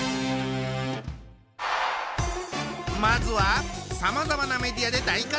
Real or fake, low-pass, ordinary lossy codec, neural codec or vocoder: real; none; none; none